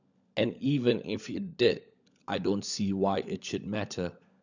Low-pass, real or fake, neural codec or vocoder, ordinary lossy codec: 7.2 kHz; fake; codec, 16 kHz, 16 kbps, FunCodec, trained on LibriTTS, 50 frames a second; none